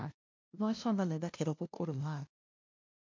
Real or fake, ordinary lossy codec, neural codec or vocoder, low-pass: fake; MP3, 48 kbps; codec, 16 kHz, 0.5 kbps, FunCodec, trained on LibriTTS, 25 frames a second; 7.2 kHz